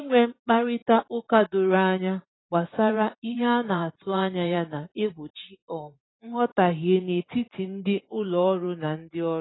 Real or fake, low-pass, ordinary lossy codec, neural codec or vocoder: fake; 7.2 kHz; AAC, 16 kbps; vocoder, 44.1 kHz, 128 mel bands every 512 samples, BigVGAN v2